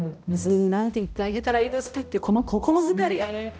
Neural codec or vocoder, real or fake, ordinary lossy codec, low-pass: codec, 16 kHz, 0.5 kbps, X-Codec, HuBERT features, trained on balanced general audio; fake; none; none